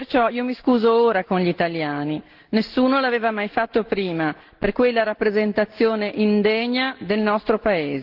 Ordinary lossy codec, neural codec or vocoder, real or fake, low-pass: Opus, 16 kbps; none; real; 5.4 kHz